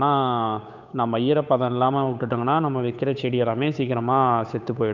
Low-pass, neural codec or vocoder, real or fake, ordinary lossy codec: 7.2 kHz; codec, 16 kHz, 8 kbps, FunCodec, trained on Chinese and English, 25 frames a second; fake; none